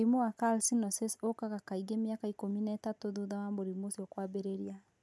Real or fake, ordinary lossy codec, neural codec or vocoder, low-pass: real; none; none; none